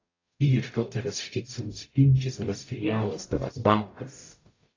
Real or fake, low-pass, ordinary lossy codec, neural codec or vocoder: fake; 7.2 kHz; AAC, 32 kbps; codec, 44.1 kHz, 0.9 kbps, DAC